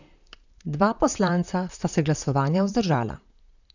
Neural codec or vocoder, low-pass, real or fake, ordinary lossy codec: vocoder, 44.1 kHz, 128 mel bands, Pupu-Vocoder; 7.2 kHz; fake; none